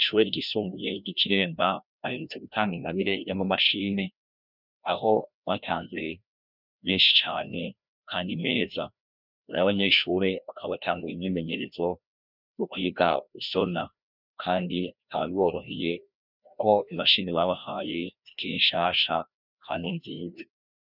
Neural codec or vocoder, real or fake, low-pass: codec, 16 kHz, 1 kbps, FreqCodec, larger model; fake; 5.4 kHz